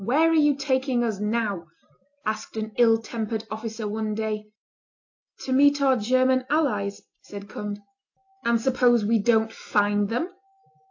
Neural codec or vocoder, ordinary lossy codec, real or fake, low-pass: none; AAC, 48 kbps; real; 7.2 kHz